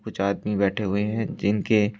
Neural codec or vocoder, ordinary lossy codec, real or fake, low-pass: none; none; real; none